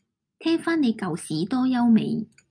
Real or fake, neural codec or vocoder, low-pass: real; none; 9.9 kHz